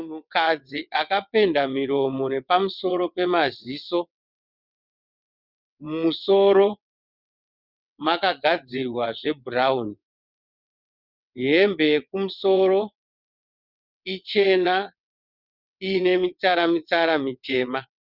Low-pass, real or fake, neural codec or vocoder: 5.4 kHz; fake; vocoder, 22.05 kHz, 80 mel bands, WaveNeXt